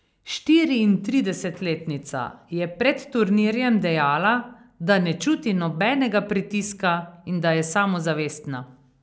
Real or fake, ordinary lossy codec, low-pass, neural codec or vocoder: real; none; none; none